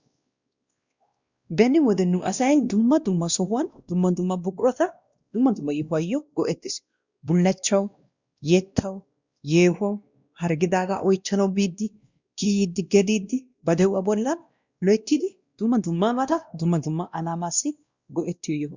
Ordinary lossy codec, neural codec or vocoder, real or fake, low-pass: Opus, 64 kbps; codec, 16 kHz, 1 kbps, X-Codec, WavLM features, trained on Multilingual LibriSpeech; fake; 7.2 kHz